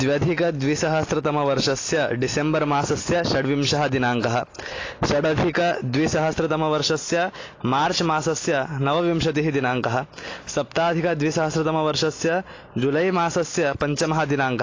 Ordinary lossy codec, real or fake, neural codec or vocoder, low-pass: AAC, 32 kbps; real; none; 7.2 kHz